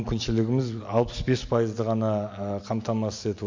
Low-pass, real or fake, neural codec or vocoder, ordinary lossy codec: 7.2 kHz; real; none; MP3, 48 kbps